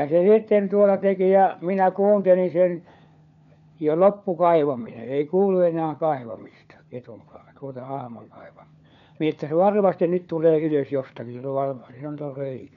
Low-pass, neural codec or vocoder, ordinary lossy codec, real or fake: 7.2 kHz; codec, 16 kHz, 4 kbps, FunCodec, trained on LibriTTS, 50 frames a second; none; fake